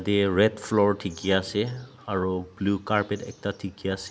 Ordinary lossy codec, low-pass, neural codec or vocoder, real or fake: none; none; none; real